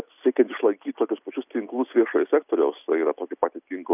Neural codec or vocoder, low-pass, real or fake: none; 3.6 kHz; real